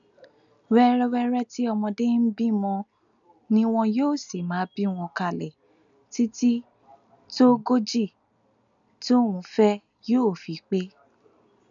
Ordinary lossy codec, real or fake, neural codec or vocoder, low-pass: none; real; none; 7.2 kHz